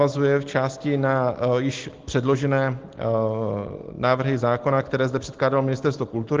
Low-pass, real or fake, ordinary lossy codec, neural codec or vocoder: 7.2 kHz; real; Opus, 16 kbps; none